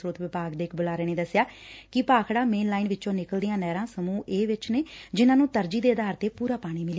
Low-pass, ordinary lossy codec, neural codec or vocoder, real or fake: none; none; none; real